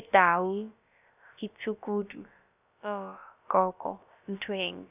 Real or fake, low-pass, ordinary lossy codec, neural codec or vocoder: fake; 3.6 kHz; none; codec, 16 kHz, about 1 kbps, DyCAST, with the encoder's durations